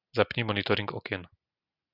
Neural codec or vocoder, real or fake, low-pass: none; real; 5.4 kHz